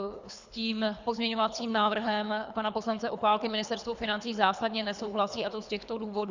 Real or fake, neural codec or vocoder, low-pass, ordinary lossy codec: fake; codec, 24 kHz, 3 kbps, HILCodec; 7.2 kHz; Opus, 64 kbps